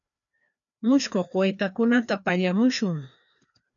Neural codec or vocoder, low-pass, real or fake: codec, 16 kHz, 2 kbps, FreqCodec, larger model; 7.2 kHz; fake